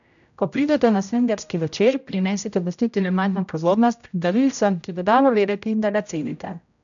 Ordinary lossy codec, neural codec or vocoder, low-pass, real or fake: none; codec, 16 kHz, 0.5 kbps, X-Codec, HuBERT features, trained on general audio; 7.2 kHz; fake